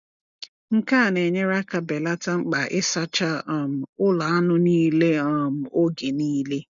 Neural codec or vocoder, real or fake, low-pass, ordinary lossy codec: none; real; 7.2 kHz; none